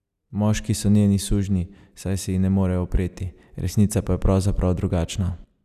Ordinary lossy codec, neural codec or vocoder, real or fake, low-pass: none; none; real; 14.4 kHz